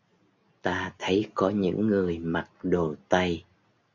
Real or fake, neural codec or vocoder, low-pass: real; none; 7.2 kHz